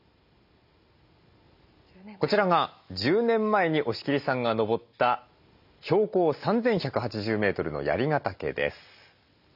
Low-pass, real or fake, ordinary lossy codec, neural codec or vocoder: 5.4 kHz; real; none; none